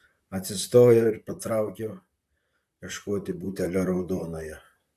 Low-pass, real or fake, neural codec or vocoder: 14.4 kHz; fake; vocoder, 44.1 kHz, 128 mel bands, Pupu-Vocoder